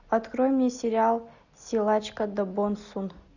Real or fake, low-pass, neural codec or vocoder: real; 7.2 kHz; none